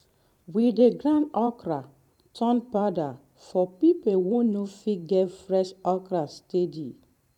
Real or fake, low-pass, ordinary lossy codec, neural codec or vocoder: fake; 19.8 kHz; none; vocoder, 44.1 kHz, 128 mel bands every 512 samples, BigVGAN v2